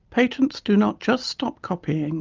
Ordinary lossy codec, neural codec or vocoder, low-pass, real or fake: Opus, 24 kbps; none; 7.2 kHz; real